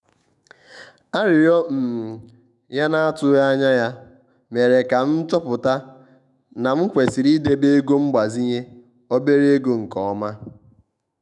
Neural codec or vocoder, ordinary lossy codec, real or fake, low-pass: autoencoder, 48 kHz, 128 numbers a frame, DAC-VAE, trained on Japanese speech; none; fake; 10.8 kHz